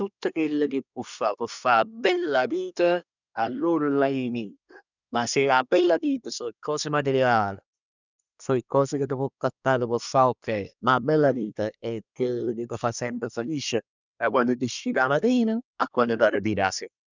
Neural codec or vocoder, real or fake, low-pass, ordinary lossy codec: codec, 24 kHz, 1 kbps, SNAC; fake; 7.2 kHz; none